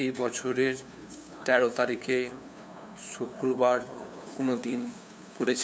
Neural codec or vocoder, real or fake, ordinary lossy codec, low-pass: codec, 16 kHz, 2 kbps, FunCodec, trained on LibriTTS, 25 frames a second; fake; none; none